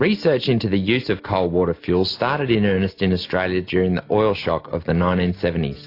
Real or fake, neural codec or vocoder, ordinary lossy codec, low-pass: real; none; AAC, 32 kbps; 5.4 kHz